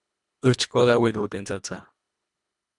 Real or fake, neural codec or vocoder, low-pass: fake; codec, 24 kHz, 1.5 kbps, HILCodec; 10.8 kHz